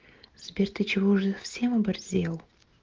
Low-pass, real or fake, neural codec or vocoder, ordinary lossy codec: 7.2 kHz; real; none; Opus, 16 kbps